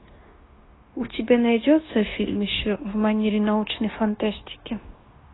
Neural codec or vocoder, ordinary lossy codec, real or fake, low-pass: codec, 16 kHz, 0.9 kbps, LongCat-Audio-Codec; AAC, 16 kbps; fake; 7.2 kHz